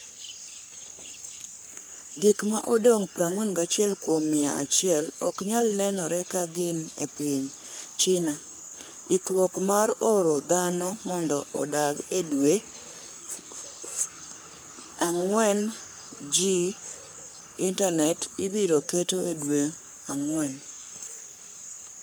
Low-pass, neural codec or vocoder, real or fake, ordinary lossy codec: none; codec, 44.1 kHz, 3.4 kbps, Pupu-Codec; fake; none